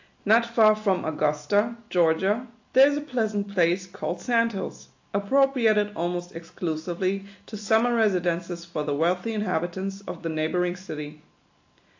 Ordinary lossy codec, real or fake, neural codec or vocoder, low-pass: AAC, 48 kbps; real; none; 7.2 kHz